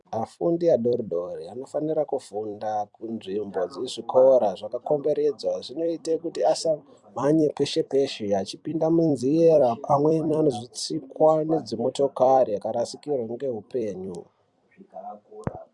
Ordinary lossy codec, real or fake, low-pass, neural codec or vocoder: MP3, 96 kbps; fake; 10.8 kHz; vocoder, 44.1 kHz, 128 mel bands every 256 samples, BigVGAN v2